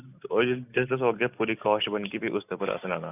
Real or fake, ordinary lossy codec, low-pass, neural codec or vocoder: real; none; 3.6 kHz; none